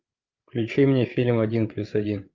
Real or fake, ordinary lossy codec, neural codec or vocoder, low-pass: fake; Opus, 24 kbps; codec, 16 kHz, 8 kbps, FreqCodec, larger model; 7.2 kHz